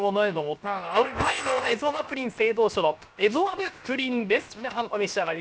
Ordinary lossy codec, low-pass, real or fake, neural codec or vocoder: none; none; fake; codec, 16 kHz, 0.7 kbps, FocalCodec